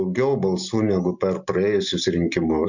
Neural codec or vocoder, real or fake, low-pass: none; real; 7.2 kHz